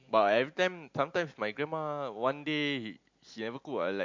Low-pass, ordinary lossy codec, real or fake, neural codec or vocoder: 7.2 kHz; MP3, 48 kbps; real; none